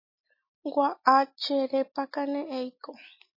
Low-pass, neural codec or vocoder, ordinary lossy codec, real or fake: 5.4 kHz; none; MP3, 24 kbps; real